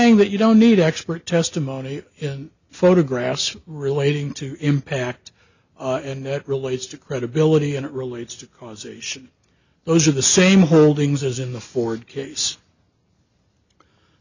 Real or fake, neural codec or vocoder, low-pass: real; none; 7.2 kHz